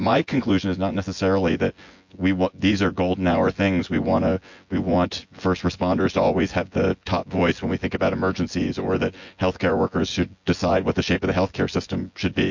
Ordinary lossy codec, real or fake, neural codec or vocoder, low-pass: MP3, 48 kbps; fake; vocoder, 24 kHz, 100 mel bands, Vocos; 7.2 kHz